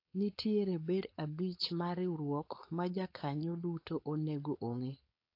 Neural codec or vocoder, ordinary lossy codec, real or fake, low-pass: codec, 16 kHz, 4.8 kbps, FACodec; AAC, 32 kbps; fake; 5.4 kHz